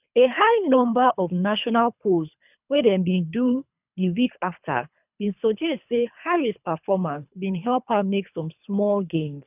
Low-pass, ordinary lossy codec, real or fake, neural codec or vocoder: 3.6 kHz; none; fake; codec, 24 kHz, 3 kbps, HILCodec